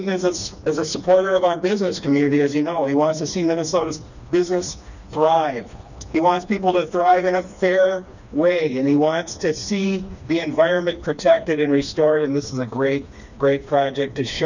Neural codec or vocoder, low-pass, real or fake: codec, 16 kHz, 2 kbps, FreqCodec, smaller model; 7.2 kHz; fake